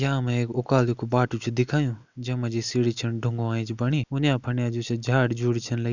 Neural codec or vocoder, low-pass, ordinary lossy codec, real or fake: none; 7.2 kHz; none; real